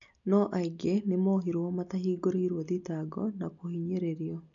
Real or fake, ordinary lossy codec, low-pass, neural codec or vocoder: real; none; 7.2 kHz; none